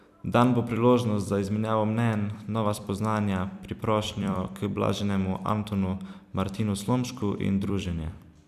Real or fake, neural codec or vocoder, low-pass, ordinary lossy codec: fake; vocoder, 44.1 kHz, 128 mel bands every 256 samples, BigVGAN v2; 14.4 kHz; none